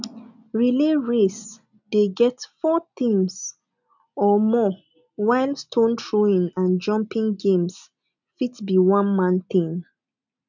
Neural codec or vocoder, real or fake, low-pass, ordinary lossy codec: none; real; 7.2 kHz; none